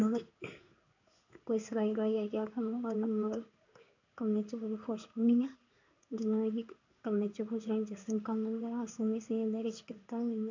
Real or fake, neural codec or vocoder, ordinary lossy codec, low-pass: fake; codec, 16 kHz in and 24 kHz out, 1 kbps, XY-Tokenizer; none; 7.2 kHz